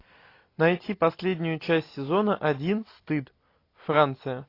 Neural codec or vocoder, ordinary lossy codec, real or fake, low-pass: none; AAC, 32 kbps; real; 5.4 kHz